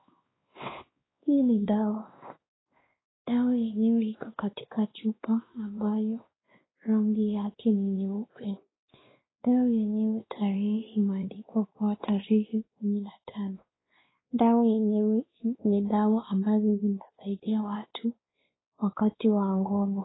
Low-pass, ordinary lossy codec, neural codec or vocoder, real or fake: 7.2 kHz; AAC, 16 kbps; codec, 16 kHz, 2 kbps, X-Codec, WavLM features, trained on Multilingual LibriSpeech; fake